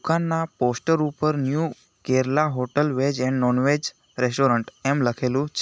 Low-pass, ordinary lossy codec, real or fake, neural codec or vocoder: none; none; real; none